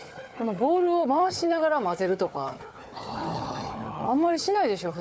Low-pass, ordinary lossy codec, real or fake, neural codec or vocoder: none; none; fake; codec, 16 kHz, 4 kbps, FunCodec, trained on Chinese and English, 50 frames a second